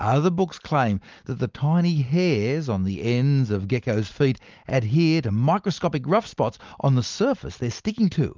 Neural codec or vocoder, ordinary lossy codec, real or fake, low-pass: none; Opus, 32 kbps; real; 7.2 kHz